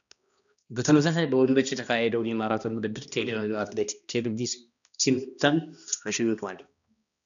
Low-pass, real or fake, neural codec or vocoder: 7.2 kHz; fake; codec, 16 kHz, 1 kbps, X-Codec, HuBERT features, trained on balanced general audio